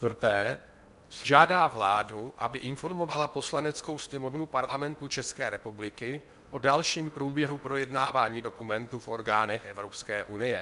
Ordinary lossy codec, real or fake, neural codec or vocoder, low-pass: MP3, 96 kbps; fake; codec, 16 kHz in and 24 kHz out, 0.8 kbps, FocalCodec, streaming, 65536 codes; 10.8 kHz